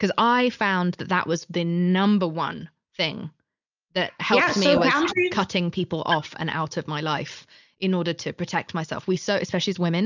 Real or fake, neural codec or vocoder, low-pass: real; none; 7.2 kHz